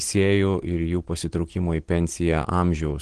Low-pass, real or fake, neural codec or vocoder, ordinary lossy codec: 9.9 kHz; real; none; Opus, 16 kbps